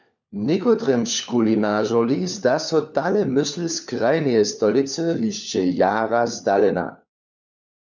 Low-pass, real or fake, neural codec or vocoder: 7.2 kHz; fake; codec, 16 kHz, 4 kbps, FunCodec, trained on LibriTTS, 50 frames a second